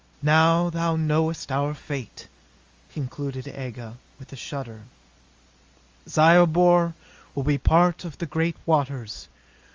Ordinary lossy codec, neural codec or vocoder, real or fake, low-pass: Opus, 32 kbps; none; real; 7.2 kHz